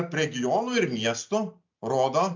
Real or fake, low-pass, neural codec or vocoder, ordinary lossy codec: real; 7.2 kHz; none; MP3, 64 kbps